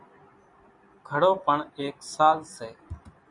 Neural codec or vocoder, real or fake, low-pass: vocoder, 44.1 kHz, 128 mel bands every 256 samples, BigVGAN v2; fake; 10.8 kHz